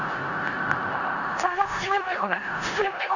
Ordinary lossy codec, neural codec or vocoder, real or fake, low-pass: none; codec, 16 kHz in and 24 kHz out, 0.4 kbps, LongCat-Audio-Codec, four codebook decoder; fake; 7.2 kHz